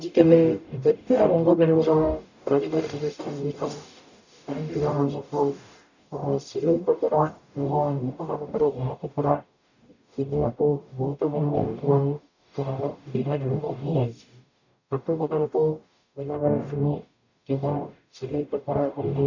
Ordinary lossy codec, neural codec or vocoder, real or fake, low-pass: none; codec, 44.1 kHz, 0.9 kbps, DAC; fake; 7.2 kHz